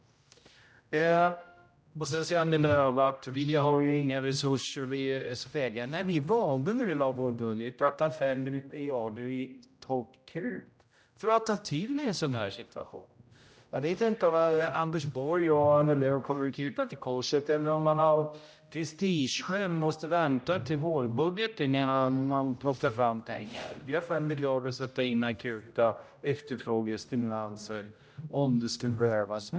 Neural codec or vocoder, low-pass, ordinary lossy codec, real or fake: codec, 16 kHz, 0.5 kbps, X-Codec, HuBERT features, trained on general audio; none; none; fake